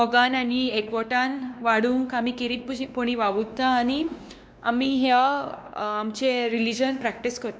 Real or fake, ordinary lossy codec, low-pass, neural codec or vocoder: fake; none; none; codec, 16 kHz, 2 kbps, X-Codec, WavLM features, trained on Multilingual LibriSpeech